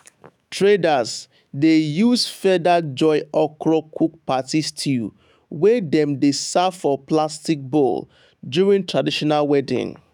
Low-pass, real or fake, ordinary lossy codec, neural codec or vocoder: 19.8 kHz; fake; none; autoencoder, 48 kHz, 128 numbers a frame, DAC-VAE, trained on Japanese speech